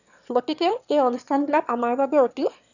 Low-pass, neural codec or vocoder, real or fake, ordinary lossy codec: 7.2 kHz; autoencoder, 22.05 kHz, a latent of 192 numbers a frame, VITS, trained on one speaker; fake; none